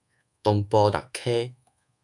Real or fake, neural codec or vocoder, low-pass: fake; codec, 24 kHz, 1.2 kbps, DualCodec; 10.8 kHz